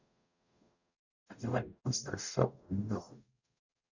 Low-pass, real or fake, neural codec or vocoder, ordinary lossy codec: 7.2 kHz; fake; codec, 44.1 kHz, 0.9 kbps, DAC; none